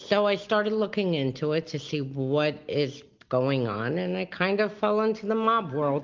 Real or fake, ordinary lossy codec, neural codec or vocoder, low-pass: real; Opus, 32 kbps; none; 7.2 kHz